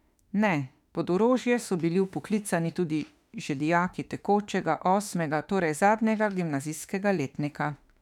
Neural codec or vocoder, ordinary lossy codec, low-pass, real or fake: autoencoder, 48 kHz, 32 numbers a frame, DAC-VAE, trained on Japanese speech; none; 19.8 kHz; fake